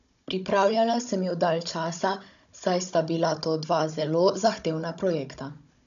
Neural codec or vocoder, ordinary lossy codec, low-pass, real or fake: codec, 16 kHz, 16 kbps, FunCodec, trained on Chinese and English, 50 frames a second; none; 7.2 kHz; fake